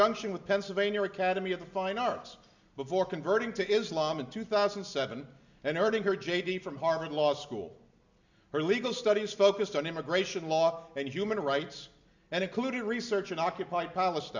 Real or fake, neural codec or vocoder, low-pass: real; none; 7.2 kHz